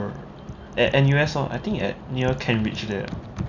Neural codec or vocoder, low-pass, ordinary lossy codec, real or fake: none; 7.2 kHz; none; real